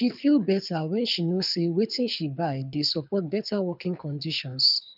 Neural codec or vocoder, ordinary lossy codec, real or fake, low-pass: codec, 24 kHz, 6 kbps, HILCodec; none; fake; 5.4 kHz